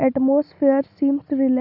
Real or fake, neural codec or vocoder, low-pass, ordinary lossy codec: real; none; 5.4 kHz; none